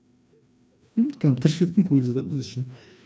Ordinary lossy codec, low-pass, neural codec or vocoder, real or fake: none; none; codec, 16 kHz, 1 kbps, FreqCodec, larger model; fake